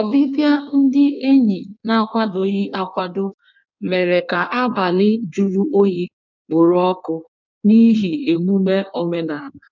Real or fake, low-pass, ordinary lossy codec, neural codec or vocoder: fake; 7.2 kHz; none; codec, 16 kHz in and 24 kHz out, 1.1 kbps, FireRedTTS-2 codec